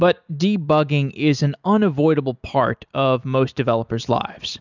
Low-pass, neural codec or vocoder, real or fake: 7.2 kHz; none; real